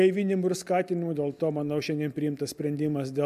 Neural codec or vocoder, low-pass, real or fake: none; 14.4 kHz; real